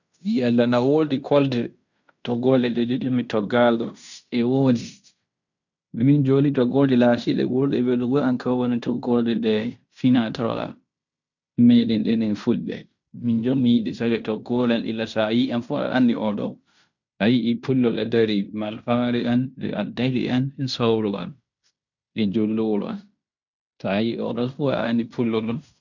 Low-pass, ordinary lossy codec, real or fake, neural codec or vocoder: 7.2 kHz; none; fake; codec, 16 kHz in and 24 kHz out, 0.9 kbps, LongCat-Audio-Codec, fine tuned four codebook decoder